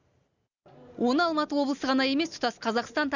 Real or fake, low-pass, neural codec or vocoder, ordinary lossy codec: real; 7.2 kHz; none; none